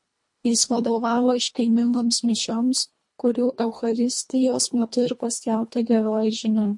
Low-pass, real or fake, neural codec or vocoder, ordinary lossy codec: 10.8 kHz; fake; codec, 24 kHz, 1.5 kbps, HILCodec; MP3, 48 kbps